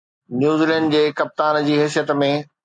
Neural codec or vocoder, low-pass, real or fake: none; 9.9 kHz; real